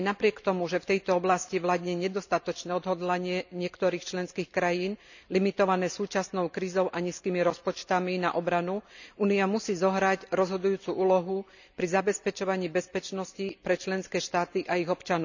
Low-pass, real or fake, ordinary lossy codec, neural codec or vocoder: 7.2 kHz; real; none; none